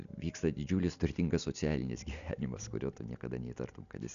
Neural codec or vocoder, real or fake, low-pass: none; real; 7.2 kHz